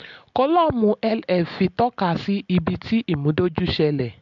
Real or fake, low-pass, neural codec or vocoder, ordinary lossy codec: real; 7.2 kHz; none; MP3, 64 kbps